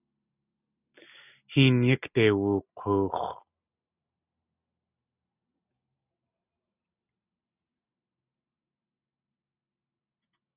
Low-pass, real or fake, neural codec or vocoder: 3.6 kHz; real; none